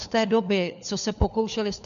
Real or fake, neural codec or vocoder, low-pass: fake; codec, 16 kHz, 4 kbps, FunCodec, trained on LibriTTS, 50 frames a second; 7.2 kHz